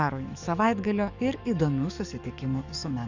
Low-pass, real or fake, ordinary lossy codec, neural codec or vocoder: 7.2 kHz; fake; Opus, 64 kbps; codec, 44.1 kHz, 7.8 kbps, DAC